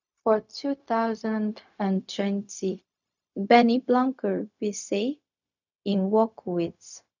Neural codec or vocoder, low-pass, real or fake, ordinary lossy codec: codec, 16 kHz, 0.4 kbps, LongCat-Audio-Codec; 7.2 kHz; fake; none